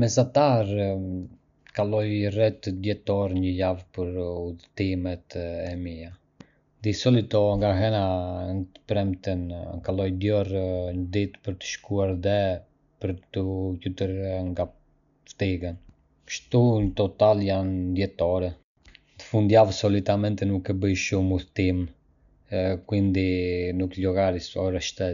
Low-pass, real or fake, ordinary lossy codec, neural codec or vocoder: 7.2 kHz; real; none; none